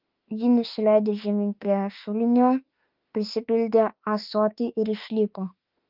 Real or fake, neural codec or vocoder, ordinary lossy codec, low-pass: fake; autoencoder, 48 kHz, 32 numbers a frame, DAC-VAE, trained on Japanese speech; Opus, 24 kbps; 5.4 kHz